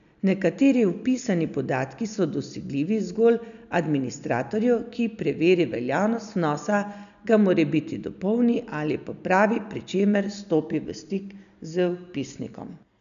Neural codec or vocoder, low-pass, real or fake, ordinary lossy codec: none; 7.2 kHz; real; none